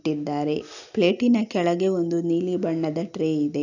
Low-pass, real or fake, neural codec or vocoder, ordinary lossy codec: 7.2 kHz; real; none; none